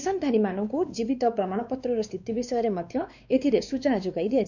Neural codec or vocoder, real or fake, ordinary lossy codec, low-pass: codec, 16 kHz, 2 kbps, X-Codec, WavLM features, trained on Multilingual LibriSpeech; fake; none; 7.2 kHz